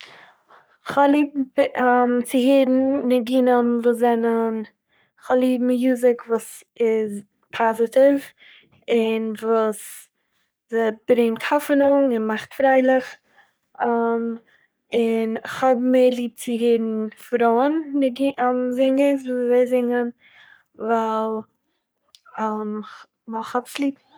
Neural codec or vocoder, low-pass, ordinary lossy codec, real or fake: codec, 44.1 kHz, 3.4 kbps, Pupu-Codec; none; none; fake